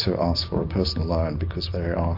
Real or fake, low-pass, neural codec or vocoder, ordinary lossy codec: real; 5.4 kHz; none; MP3, 32 kbps